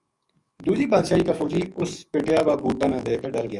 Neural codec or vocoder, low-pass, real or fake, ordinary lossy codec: codec, 44.1 kHz, 7.8 kbps, DAC; 10.8 kHz; fake; Opus, 64 kbps